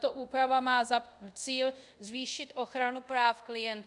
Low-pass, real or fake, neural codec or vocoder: 10.8 kHz; fake; codec, 24 kHz, 0.5 kbps, DualCodec